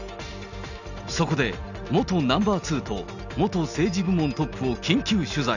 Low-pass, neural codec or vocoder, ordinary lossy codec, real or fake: 7.2 kHz; none; none; real